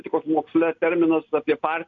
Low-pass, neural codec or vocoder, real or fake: 7.2 kHz; none; real